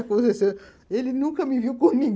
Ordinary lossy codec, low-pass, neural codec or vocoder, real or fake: none; none; none; real